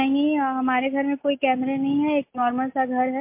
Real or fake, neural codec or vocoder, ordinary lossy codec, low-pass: real; none; MP3, 24 kbps; 3.6 kHz